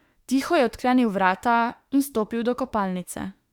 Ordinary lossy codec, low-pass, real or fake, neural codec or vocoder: Opus, 64 kbps; 19.8 kHz; fake; autoencoder, 48 kHz, 32 numbers a frame, DAC-VAE, trained on Japanese speech